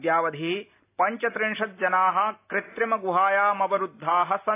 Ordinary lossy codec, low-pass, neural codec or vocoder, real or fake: AAC, 24 kbps; 3.6 kHz; none; real